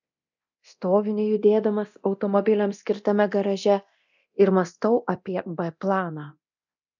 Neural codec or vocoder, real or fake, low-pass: codec, 24 kHz, 0.9 kbps, DualCodec; fake; 7.2 kHz